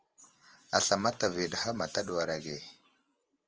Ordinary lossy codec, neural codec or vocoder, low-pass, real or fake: Opus, 24 kbps; vocoder, 44.1 kHz, 128 mel bands every 512 samples, BigVGAN v2; 7.2 kHz; fake